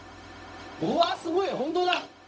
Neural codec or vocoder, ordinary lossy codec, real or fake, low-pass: codec, 16 kHz, 0.4 kbps, LongCat-Audio-Codec; none; fake; none